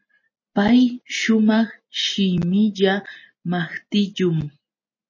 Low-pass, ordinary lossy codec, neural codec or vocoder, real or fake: 7.2 kHz; MP3, 32 kbps; none; real